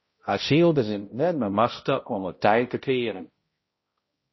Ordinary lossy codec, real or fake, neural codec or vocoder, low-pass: MP3, 24 kbps; fake; codec, 16 kHz, 0.5 kbps, X-Codec, HuBERT features, trained on balanced general audio; 7.2 kHz